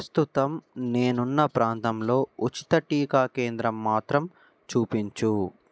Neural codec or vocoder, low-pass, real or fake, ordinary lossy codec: none; none; real; none